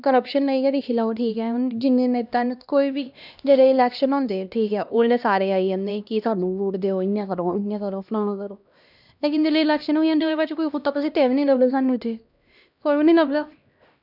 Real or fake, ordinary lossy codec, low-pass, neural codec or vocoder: fake; none; 5.4 kHz; codec, 16 kHz, 1 kbps, X-Codec, HuBERT features, trained on LibriSpeech